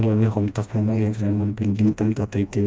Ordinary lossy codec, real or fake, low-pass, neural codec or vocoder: none; fake; none; codec, 16 kHz, 1 kbps, FreqCodec, smaller model